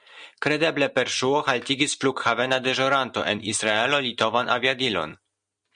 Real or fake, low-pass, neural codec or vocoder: real; 9.9 kHz; none